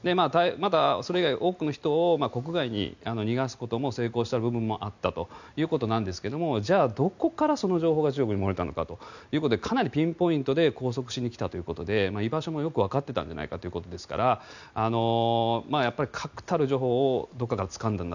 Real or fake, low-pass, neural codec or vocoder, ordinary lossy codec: real; 7.2 kHz; none; none